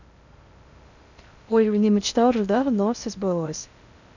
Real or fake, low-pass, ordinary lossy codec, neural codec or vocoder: fake; 7.2 kHz; none; codec, 16 kHz in and 24 kHz out, 0.6 kbps, FocalCodec, streaming, 2048 codes